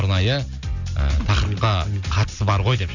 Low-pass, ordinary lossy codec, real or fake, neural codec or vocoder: 7.2 kHz; none; real; none